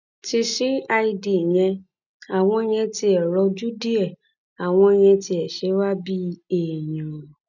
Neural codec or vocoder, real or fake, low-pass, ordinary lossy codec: none; real; 7.2 kHz; none